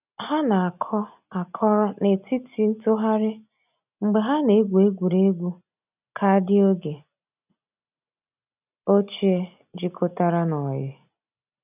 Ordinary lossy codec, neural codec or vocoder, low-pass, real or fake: none; none; 3.6 kHz; real